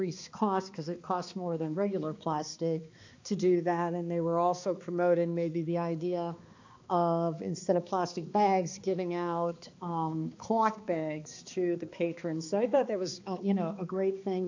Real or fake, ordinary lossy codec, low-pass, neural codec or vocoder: fake; AAC, 48 kbps; 7.2 kHz; codec, 16 kHz, 2 kbps, X-Codec, HuBERT features, trained on balanced general audio